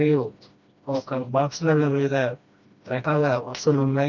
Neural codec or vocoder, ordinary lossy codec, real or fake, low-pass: codec, 16 kHz, 1 kbps, FreqCodec, smaller model; none; fake; 7.2 kHz